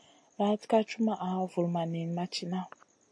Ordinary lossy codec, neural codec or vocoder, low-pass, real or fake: AAC, 48 kbps; none; 9.9 kHz; real